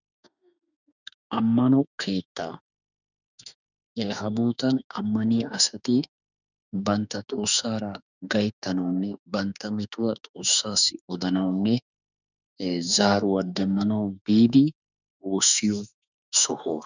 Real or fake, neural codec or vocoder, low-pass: fake; autoencoder, 48 kHz, 32 numbers a frame, DAC-VAE, trained on Japanese speech; 7.2 kHz